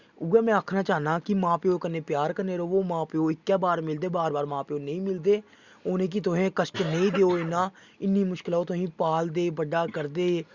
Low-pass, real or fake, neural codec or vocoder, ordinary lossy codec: 7.2 kHz; real; none; Opus, 64 kbps